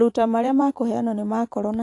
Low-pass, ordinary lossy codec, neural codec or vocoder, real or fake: 10.8 kHz; AAC, 48 kbps; vocoder, 44.1 kHz, 128 mel bands every 512 samples, BigVGAN v2; fake